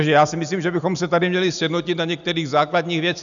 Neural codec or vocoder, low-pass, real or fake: none; 7.2 kHz; real